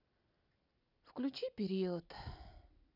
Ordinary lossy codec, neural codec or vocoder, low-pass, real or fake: none; none; 5.4 kHz; real